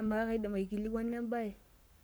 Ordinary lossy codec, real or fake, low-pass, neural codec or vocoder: none; fake; none; codec, 44.1 kHz, 7.8 kbps, Pupu-Codec